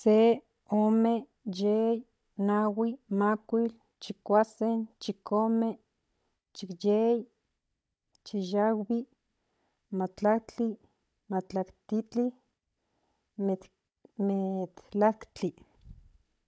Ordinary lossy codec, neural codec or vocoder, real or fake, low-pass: none; codec, 16 kHz, 16 kbps, FunCodec, trained on Chinese and English, 50 frames a second; fake; none